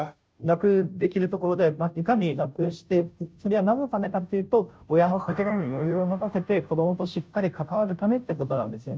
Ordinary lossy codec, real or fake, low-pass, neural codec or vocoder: none; fake; none; codec, 16 kHz, 0.5 kbps, FunCodec, trained on Chinese and English, 25 frames a second